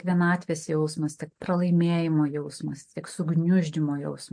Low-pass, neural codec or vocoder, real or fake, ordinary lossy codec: 9.9 kHz; none; real; MP3, 48 kbps